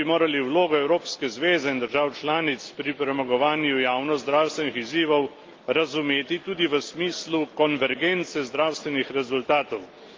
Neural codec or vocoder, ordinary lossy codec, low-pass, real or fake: none; Opus, 32 kbps; 7.2 kHz; real